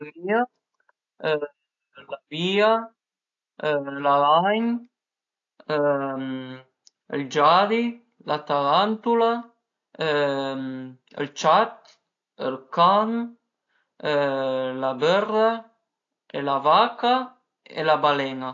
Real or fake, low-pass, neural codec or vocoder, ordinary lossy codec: real; 7.2 kHz; none; AAC, 48 kbps